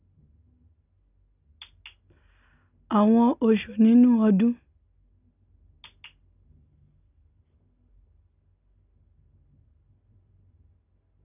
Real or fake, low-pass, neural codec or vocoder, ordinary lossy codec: real; 3.6 kHz; none; none